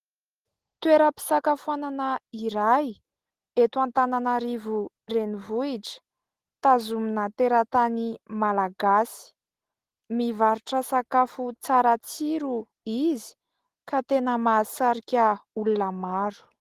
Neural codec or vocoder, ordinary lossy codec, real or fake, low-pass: none; Opus, 16 kbps; real; 14.4 kHz